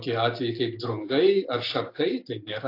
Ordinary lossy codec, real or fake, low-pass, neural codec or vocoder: AAC, 32 kbps; real; 5.4 kHz; none